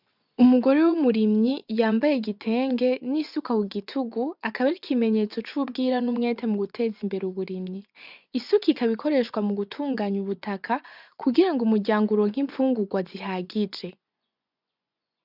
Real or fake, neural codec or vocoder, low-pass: fake; vocoder, 24 kHz, 100 mel bands, Vocos; 5.4 kHz